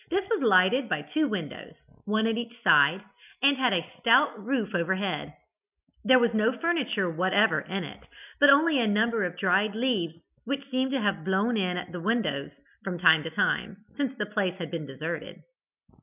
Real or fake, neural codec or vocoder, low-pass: real; none; 3.6 kHz